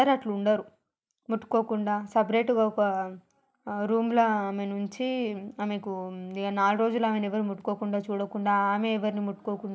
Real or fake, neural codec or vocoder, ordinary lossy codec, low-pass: real; none; none; none